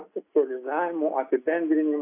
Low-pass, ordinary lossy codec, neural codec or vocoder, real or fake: 3.6 kHz; AAC, 24 kbps; none; real